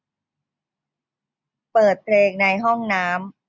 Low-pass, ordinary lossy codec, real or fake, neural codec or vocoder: none; none; real; none